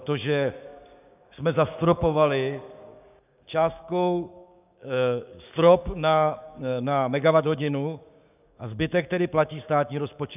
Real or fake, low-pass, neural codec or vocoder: fake; 3.6 kHz; codec, 16 kHz, 6 kbps, DAC